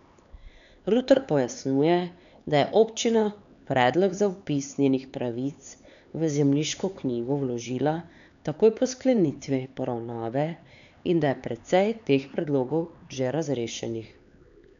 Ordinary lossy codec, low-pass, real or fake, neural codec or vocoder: none; 7.2 kHz; fake; codec, 16 kHz, 4 kbps, X-Codec, HuBERT features, trained on LibriSpeech